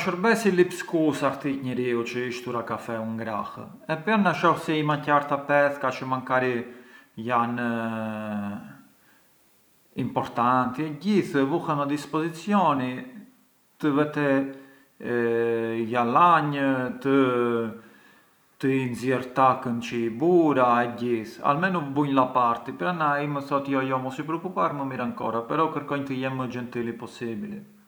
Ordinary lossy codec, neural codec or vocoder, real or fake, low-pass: none; none; real; none